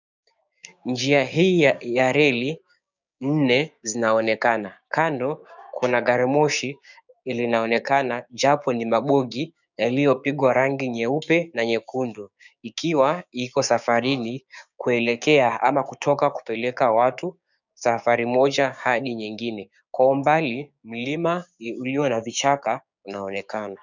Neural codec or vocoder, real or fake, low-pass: codec, 16 kHz, 6 kbps, DAC; fake; 7.2 kHz